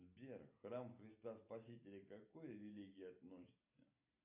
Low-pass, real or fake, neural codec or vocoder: 3.6 kHz; real; none